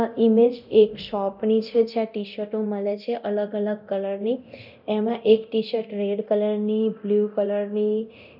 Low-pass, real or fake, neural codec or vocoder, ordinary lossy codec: 5.4 kHz; fake; codec, 24 kHz, 0.9 kbps, DualCodec; none